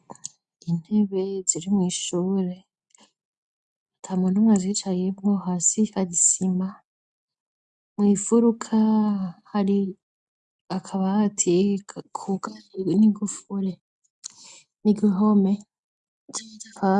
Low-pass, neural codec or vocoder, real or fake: 10.8 kHz; none; real